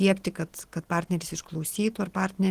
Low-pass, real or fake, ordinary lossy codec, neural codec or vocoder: 14.4 kHz; real; Opus, 16 kbps; none